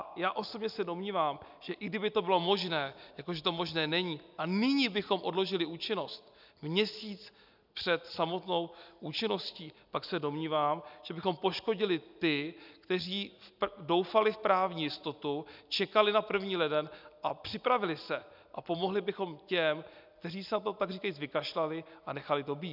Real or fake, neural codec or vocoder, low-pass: real; none; 5.4 kHz